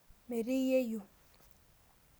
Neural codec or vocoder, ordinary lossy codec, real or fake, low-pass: none; none; real; none